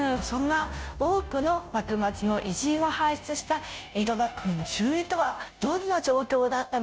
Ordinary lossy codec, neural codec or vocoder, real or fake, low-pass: none; codec, 16 kHz, 0.5 kbps, FunCodec, trained on Chinese and English, 25 frames a second; fake; none